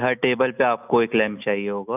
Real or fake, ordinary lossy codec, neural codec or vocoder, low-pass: real; none; none; 3.6 kHz